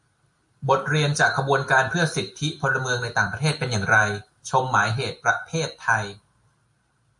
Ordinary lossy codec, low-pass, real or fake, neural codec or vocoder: MP3, 48 kbps; 10.8 kHz; real; none